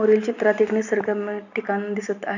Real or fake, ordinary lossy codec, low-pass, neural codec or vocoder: real; none; 7.2 kHz; none